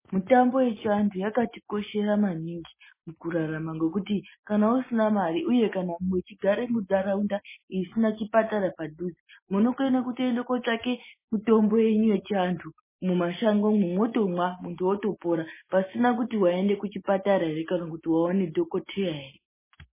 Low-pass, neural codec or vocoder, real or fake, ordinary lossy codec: 3.6 kHz; none; real; MP3, 16 kbps